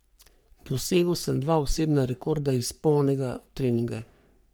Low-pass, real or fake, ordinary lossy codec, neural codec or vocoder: none; fake; none; codec, 44.1 kHz, 3.4 kbps, Pupu-Codec